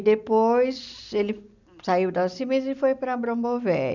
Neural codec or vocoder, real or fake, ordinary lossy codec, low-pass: none; real; none; 7.2 kHz